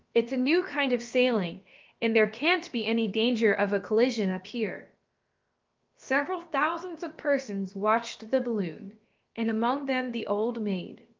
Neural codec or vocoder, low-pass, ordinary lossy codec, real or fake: codec, 16 kHz, about 1 kbps, DyCAST, with the encoder's durations; 7.2 kHz; Opus, 24 kbps; fake